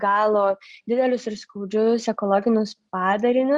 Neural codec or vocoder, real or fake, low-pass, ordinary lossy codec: none; real; 10.8 kHz; Opus, 64 kbps